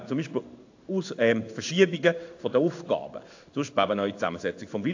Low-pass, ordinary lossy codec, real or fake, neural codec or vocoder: 7.2 kHz; AAC, 48 kbps; fake; codec, 16 kHz in and 24 kHz out, 1 kbps, XY-Tokenizer